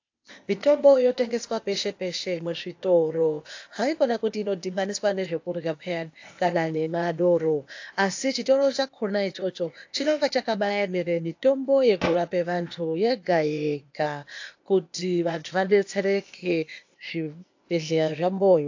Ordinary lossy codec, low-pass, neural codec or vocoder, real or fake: AAC, 48 kbps; 7.2 kHz; codec, 16 kHz, 0.8 kbps, ZipCodec; fake